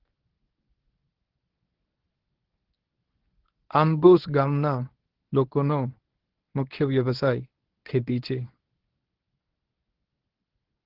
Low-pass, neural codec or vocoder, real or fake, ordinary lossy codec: 5.4 kHz; codec, 24 kHz, 0.9 kbps, WavTokenizer, medium speech release version 1; fake; Opus, 16 kbps